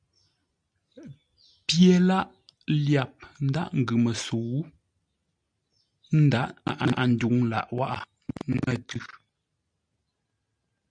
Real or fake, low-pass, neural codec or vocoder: real; 9.9 kHz; none